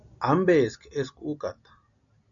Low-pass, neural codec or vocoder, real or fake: 7.2 kHz; none; real